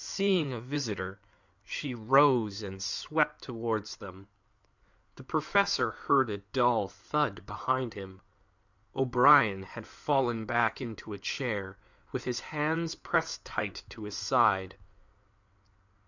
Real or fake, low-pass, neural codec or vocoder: fake; 7.2 kHz; codec, 16 kHz in and 24 kHz out, 2.2 kbps, FireRedTTS-2 codec